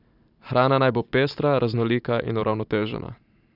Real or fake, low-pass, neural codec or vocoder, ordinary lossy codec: fake; 5.4 kHz; vocoder, 44.1 kHz, 128 mel bands every 512 samples, BigVGAN v2; none